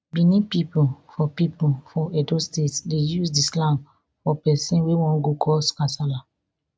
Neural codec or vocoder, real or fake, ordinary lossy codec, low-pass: none; real; none; none